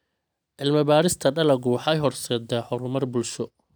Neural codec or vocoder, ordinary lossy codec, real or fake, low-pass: none; none; real; none